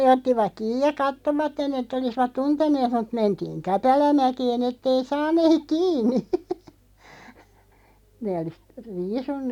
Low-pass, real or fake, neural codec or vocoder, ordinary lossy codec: 19.8 kHz; real; none; none